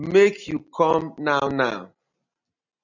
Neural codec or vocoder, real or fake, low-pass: none; real; 7.2 kHz